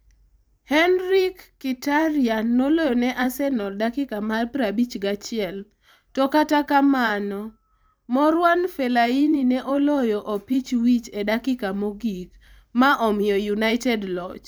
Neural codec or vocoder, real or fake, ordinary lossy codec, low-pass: vocoder, 44.1 kHz, 128 mel bands every 512 samples, BigVGAN v2; fake; none; none